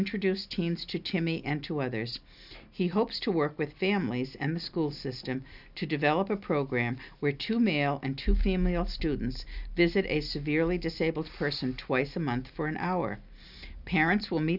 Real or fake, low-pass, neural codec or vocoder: real; 5.4 kHz; none